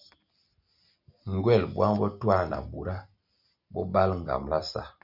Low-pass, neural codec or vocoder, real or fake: 5.4 kHz; none; real